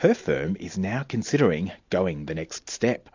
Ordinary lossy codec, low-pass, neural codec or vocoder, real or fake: AAC, 48 kbps; 7.2 kHz; none; real